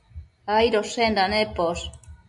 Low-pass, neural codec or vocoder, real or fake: 10.8 kHz; none; real